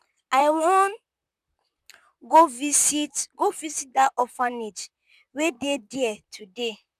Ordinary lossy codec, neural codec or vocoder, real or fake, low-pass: none; vocoder, 44.1 kHz, 128 mel bands, Pupu-Vocoder; fake; 14.4 kHz